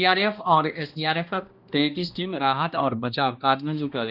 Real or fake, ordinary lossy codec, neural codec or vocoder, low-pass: fake; Opus, 24 kbps; codec, 16 kHz, 1 kbps, X-Codec, HuBERT features, trained on balanced general audio; 5.4 kHz